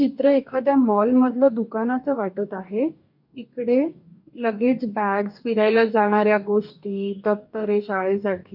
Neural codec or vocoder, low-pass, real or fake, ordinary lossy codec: codec, 44.1 kHz, 2.6 kbps, DAC; 5.4 kHz; fake; none